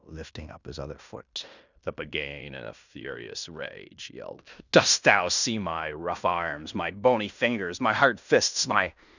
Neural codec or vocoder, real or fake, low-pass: codec, 16 kHz in and 24 kHz out, 0.9 kbps, LongCat-Audio-Codec, fine tuned four codebook decoder; fake; 7.2 kHz